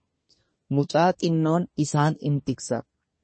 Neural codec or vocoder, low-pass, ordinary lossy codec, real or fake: codec, 24 kHz, 1 kbps, SNAC; 9.9 kHz; MP3, 32 kbps; fake